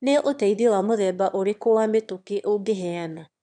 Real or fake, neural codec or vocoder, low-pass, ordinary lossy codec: fake; autoencoder, 22.05 kHz, a latent of 192 numbers a frame, VITS, trained on one speaker; 9.9 kHz; none